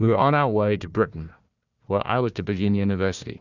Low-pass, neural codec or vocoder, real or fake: 7.2 kHz; codec, 16 kHz, 1 kbps, FunCodec, trained on Chinese and English, 50 frames a second; fake